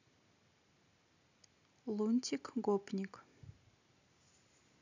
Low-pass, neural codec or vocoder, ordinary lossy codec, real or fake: 7.2 kHz; none; none; real